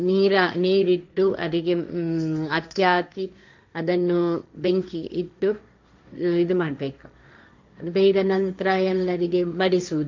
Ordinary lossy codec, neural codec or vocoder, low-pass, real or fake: MP3, 64 kbps; codec, 16 kHz, 1.1 kbps, Voila-Tokenizer; 7.2 kHz; fake